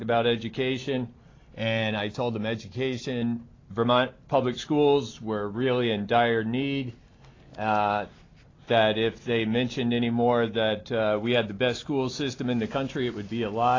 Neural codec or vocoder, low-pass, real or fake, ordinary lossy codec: none; 7.2 kHz; real; AAC, 32 kbps